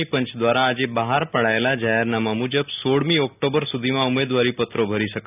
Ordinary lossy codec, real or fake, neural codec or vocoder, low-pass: none; real; none; 3.6 kHz